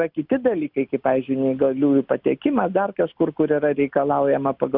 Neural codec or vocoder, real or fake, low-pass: none; real; 5.4 kHz